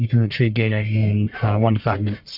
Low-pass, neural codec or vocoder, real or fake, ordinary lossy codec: 5.4 kHz; codec, 44.1 kHz, 1.7 kbps, Pupu-Codec; fake; Opus, 64 kbps